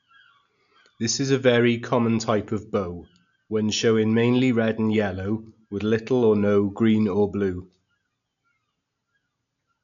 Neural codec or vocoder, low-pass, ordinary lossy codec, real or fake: none; 7.2 kHz; none; real